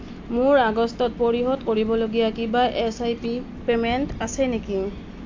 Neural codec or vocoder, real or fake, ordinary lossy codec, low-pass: none; real; AAC, 48 kbps; 7.2 kHz